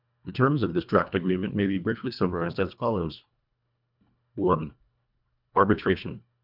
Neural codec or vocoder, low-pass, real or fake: codec, 24 kHz, 1.5 kbps, HILCodec; 5.4 kHz; fake